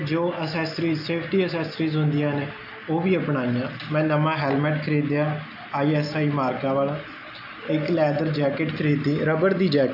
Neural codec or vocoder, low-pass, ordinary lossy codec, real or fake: none; 5.4 kHz; AAC, 48 kbps; real